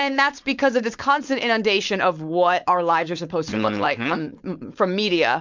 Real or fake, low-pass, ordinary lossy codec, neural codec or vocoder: fake; 7.2 kHz; MP3, 64 kbps; codec, 16 kHz, 4.8 kbps, FACodec